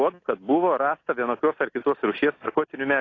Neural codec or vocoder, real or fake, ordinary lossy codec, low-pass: none; real; AAC, 32 kbps; 7.2 kHz